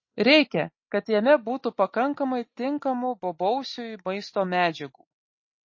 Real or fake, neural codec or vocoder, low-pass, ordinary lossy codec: real; none; 7.2 kHz; MP3, 32 kbps